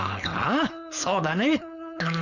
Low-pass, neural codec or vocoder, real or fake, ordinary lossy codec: 7.2 kHz; codec, 16 kHz, 4.8 kbps, FACodec; fake; none